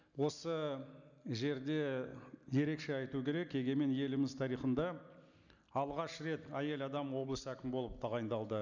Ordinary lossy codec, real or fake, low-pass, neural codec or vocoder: none; real; 7.2 kHz; none